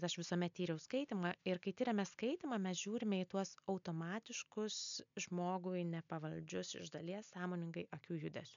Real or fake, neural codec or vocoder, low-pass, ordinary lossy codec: real; none; 7.2 kHz; MP3, 64 kbps